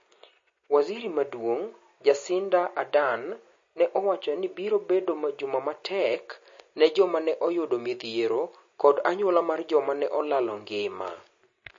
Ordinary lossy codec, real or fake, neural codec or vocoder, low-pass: MP3, 32 kbps; real; none; 7.2 kHz